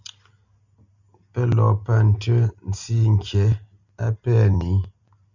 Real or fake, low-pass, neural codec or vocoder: real; 7.2 kHz; none